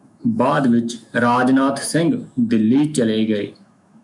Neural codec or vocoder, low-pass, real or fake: autoencoder, 48 kHz, 128 numbers a frame, DAC-VAE, trained on Japanese speech; 10.8 kHz; fake